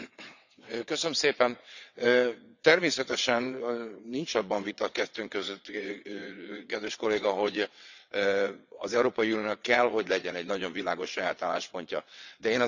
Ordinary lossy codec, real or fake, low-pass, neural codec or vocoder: none; fake; 7.2 kHz; vocoder, 22.05 kHz, 80 mel bands, WaveNeXt